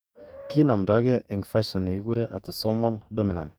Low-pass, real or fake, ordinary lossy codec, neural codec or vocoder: none; fake; none; codec, 44.1 kHz, 2.6 kbps, DAC